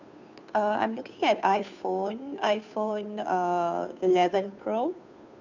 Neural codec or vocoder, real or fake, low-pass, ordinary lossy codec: codec, 16 kHz, 2 kbps, FunCodec, trained on Chinese and English, 25 frames a second; fake; 7.2 kHz; none